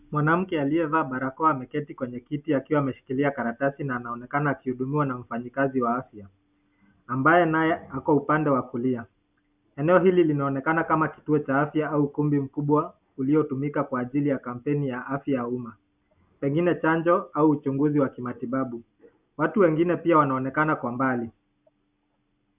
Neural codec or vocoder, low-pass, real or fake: none; 3.6 kHz; real